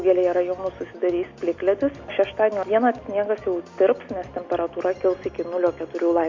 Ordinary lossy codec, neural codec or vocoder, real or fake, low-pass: MP3, 48 kbps; none; real; 7.2 kHz